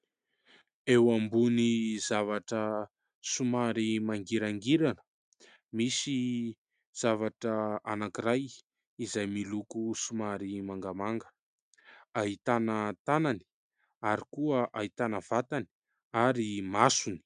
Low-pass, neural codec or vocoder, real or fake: 9.9 kHz; none; real